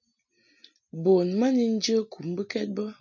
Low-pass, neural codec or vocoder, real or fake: 7.2 kHz; none; real